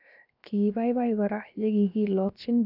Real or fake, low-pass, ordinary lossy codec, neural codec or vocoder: fake; 5.4 kHz; none; codec, 16 kHz, 0.7 kbps, FocalCodec